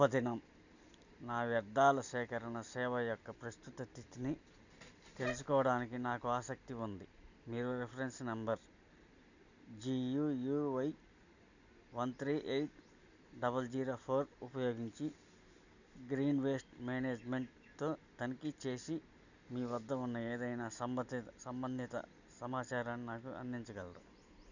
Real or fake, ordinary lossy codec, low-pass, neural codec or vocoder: real; none; 7.2 kHz; none